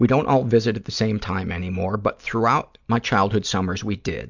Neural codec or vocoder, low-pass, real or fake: none; 7.2 kHz; real